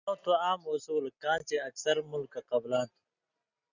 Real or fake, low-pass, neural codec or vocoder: real; 7.2 kHz; none